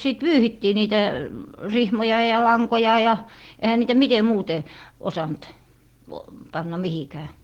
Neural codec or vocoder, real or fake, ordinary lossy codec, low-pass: none; real; Opus, 16 kbps; 19.8 kHz